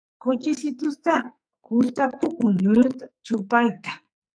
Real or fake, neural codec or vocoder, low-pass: fake; codec, 44.1 kHz, 2.6 kbps, SNAC; 9.9 kHz